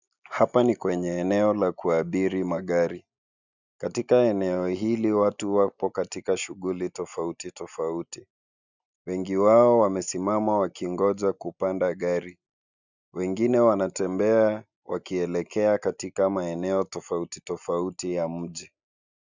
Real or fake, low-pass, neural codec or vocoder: real; 7.2 kHz; none